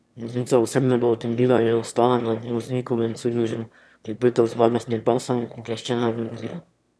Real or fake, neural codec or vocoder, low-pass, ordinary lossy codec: fake; autoencoder, 22.05 kHz, a latent of 192 numbers a frame, VITS, trained on one speaker; none; none